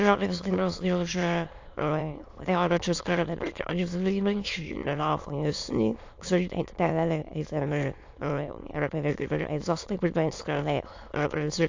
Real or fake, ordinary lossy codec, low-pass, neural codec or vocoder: fake; AAC, 48 kbps; 7.2 kHz; autoencoder, 22.05 kHz, a latent of 192 numbers a frame, VITS, trained on many speakers